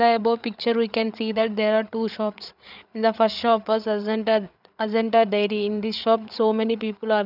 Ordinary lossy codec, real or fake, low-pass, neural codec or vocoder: none; fake; 5.4 kHz; codec, 16 kHz, 8 kbps, FreqCodec, larger model